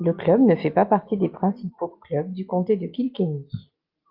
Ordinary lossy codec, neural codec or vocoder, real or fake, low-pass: Opus, 32 kbps; none; real; 5.4 kHz